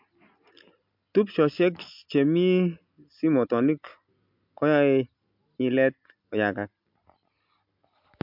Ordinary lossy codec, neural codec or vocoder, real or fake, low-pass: MP3, 48 kbps; none; real; 5.4 kHz